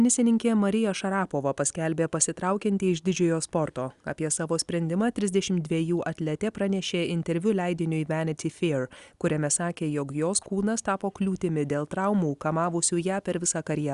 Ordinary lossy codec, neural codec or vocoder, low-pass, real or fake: Opus, 64 kbps; none; 10.8 kHz; real